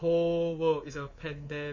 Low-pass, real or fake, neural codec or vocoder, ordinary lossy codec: 7.2 kHz; fake; codec, 24 kHz, 3.1 kbps, DualCodec; MP3, 32 kbps